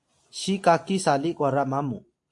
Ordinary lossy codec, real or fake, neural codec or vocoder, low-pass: AAC, 64 kbps; real; none; 10.8 kHz